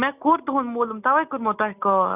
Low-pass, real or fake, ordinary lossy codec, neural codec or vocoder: 3.6 kHz; real; none; none